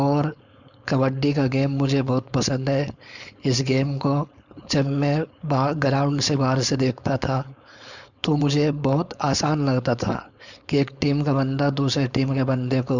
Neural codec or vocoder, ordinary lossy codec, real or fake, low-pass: codec, 16 kHz, 4.8 kbps, FACodec; none; fake; 7.2 kHz